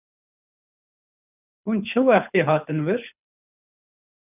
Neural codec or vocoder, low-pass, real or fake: codec, 24 kHz, 6 kbps, HILCodec; 3.6 kHz; fake